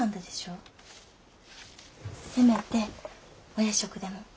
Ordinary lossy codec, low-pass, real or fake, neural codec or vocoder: none; none; real; none